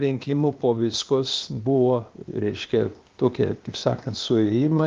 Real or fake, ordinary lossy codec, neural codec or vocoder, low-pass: fake; Opus, 32 kbps; codec, 16 kHz, 0.8 kbps, ZipCodec; 7.2 kHz